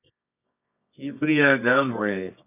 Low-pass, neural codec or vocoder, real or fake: 3.6 kHz; codec, 24 kHz, 0.9 kbps, WavTokenizer, medium music audio release; fake